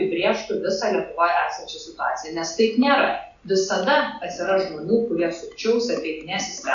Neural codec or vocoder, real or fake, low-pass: none; real; 7.2 kHz